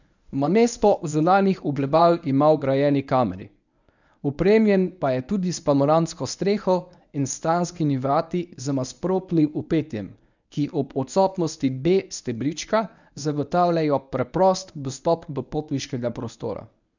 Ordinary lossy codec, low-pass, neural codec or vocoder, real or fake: none; 7.2 kHz; codec, 24 kHz, 0.9 kbps, WavTokenizer, medium speech release version 1; fake